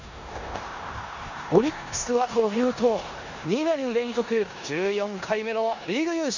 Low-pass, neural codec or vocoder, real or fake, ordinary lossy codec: 7.2 kHz; codec, 16 kHz in and 24 kHz out, 0.9 kbps, LongCat-Audio-Codec, four codebook decoder; fake; none